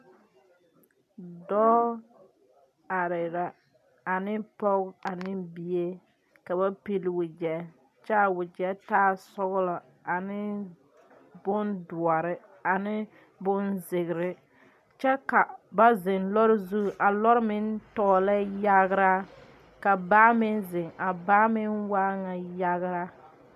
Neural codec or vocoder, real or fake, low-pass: none; real; 14.4 kHz